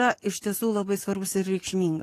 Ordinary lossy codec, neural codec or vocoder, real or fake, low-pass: AAC, 48 kbps; codec, 44.1 kHz, 7.8 kbps, DAC; fake; 14.4 kHz